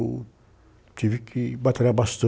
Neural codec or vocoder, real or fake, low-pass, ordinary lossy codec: none; real; none; none